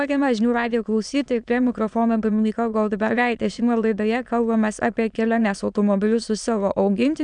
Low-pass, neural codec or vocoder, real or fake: 9.9 kHz; autoencoder, 22.05 kHz, a latent of 192 numbers a frame, VITS, trained on many speakers; fake